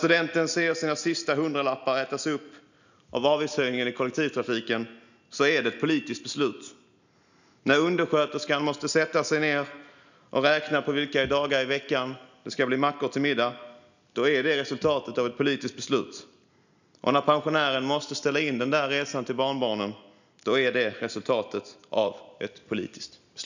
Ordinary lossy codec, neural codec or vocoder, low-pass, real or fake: none; none; 7.2 kHz; real